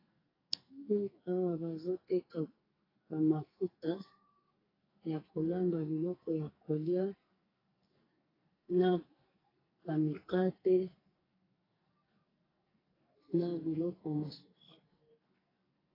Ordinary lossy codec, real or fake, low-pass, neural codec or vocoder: AAC, 24 kbps; fake; 5.4 kHz; codec, 44.1 kHz, 2.6 kbps, SNAC